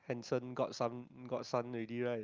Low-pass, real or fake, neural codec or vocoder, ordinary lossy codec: 7.2 kHz; real; none; Opus, 24 kbps